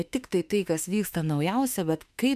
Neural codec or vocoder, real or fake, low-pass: autoencoder, 48 kHz, 32 numbers a frame, DAC-VAE, trained on Japanese speech; fake; 14.4 kHz